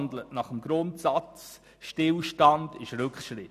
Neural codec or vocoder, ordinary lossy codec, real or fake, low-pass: none; none; real; 14.4 kHz